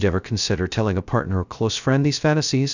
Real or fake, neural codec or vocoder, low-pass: fake; codec, 16 kHz, 0.2 kbps, FocalCodec; 7.2 kHz